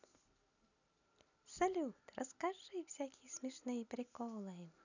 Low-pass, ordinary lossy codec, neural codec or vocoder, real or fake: 7.2 kHz; none; none; real